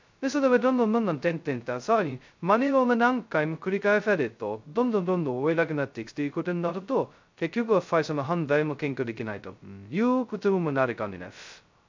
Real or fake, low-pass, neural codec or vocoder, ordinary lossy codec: fake; 7.2 kHz; codec, 16 kHz, 0.2 kbps, FocalCodec; MP3, 64 kbps